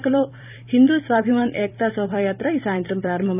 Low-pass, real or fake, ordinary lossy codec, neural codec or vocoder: 3.6 kHz; fake; none; vocoder, 44.1 kHz, 128 mel bands every 256 samples, BigVGAN v2